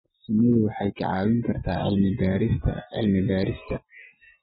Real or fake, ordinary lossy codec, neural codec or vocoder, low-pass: real; AAC, 16 kbps; none; 7.2 kHz